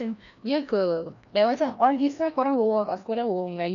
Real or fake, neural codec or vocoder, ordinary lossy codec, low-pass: fake; codec, 16 kHz, 1 kbps, FreqCodec, larger model; none; 7.2 kHz